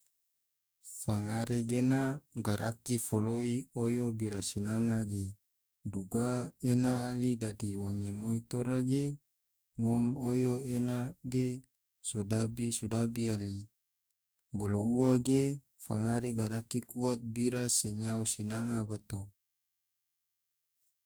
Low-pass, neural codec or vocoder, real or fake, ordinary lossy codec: none; codec, 44.1 kHz, 2.6 kbps, DAC; fake; none